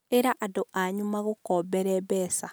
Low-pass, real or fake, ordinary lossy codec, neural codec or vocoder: none; real; none; none